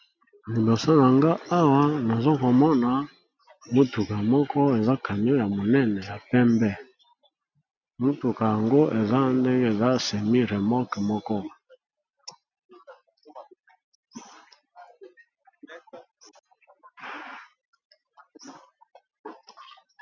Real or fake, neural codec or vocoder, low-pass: real; none; 7.2 kHz